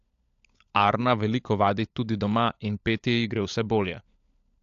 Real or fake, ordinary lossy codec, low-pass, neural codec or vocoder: fake; AAC, 64 kbps; 7.2 kHz; codec, 16 kHz, 16 kbps, FunCodec, trained on LibriTTS, 50 frames a second